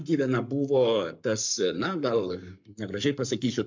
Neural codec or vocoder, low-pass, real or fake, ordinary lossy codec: codec, 44.1 kHz, 7.8 kbps, Pupu-Codec; 7.2 kHz; fake; MP3, 64 kbps